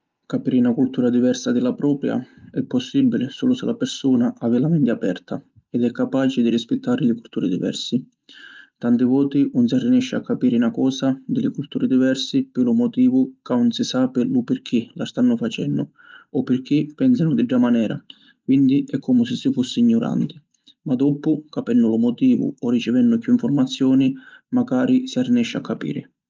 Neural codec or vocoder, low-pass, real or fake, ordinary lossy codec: none; 7.2 kHz; real; Opus, 24 kbps